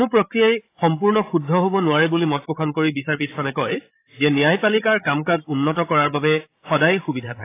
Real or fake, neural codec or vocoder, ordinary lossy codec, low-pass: fake; codec, 16 kHz, 16 kbps, FreqCodec, larger model; AAC, 24 kbps; 3.6 kHz